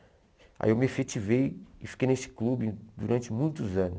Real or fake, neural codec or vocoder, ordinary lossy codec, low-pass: real; none; none; none